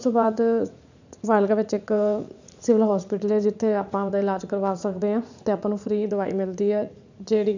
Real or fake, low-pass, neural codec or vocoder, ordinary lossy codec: fake; 7.2 kHz; vocoder, 44.1 kHz, 80 mel bands, Vocos; none